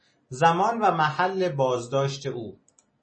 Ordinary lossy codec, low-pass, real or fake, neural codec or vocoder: MP3, 32 kbps; 9.9 kHz; real; none